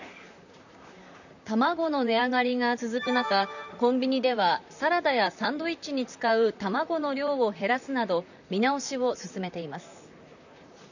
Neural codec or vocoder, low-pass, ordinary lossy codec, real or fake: vocoder, 44.1 kHz, 128 mel bands, Pupu-Vocoder; 7.2 kHz; none; fake